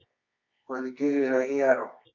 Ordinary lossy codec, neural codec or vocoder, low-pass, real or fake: MP3, 48 kbps; codec, 24 kHz, 0.9 kbps, WavTokenizer, medium music audio release; 7.2 kHz; fake